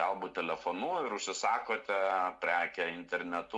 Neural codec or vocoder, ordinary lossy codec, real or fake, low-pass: none; MP3, 96 kbps; real; 10.8 kHz